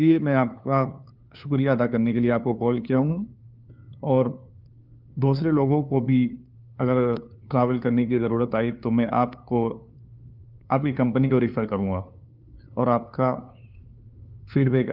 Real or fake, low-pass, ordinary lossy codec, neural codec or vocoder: fake; 5.4 kHz; Opus, 32 kbps; codec, 16 kHz, 2 kbps, FunCodec, trained on LibriTTS, 25 frames a second